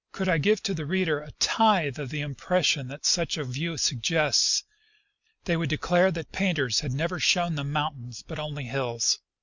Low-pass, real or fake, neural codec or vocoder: 7.2 kHz; real; none